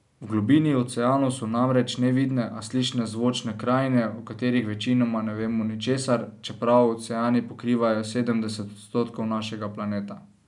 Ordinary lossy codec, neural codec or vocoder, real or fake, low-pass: none; none; real; 10.8 kHz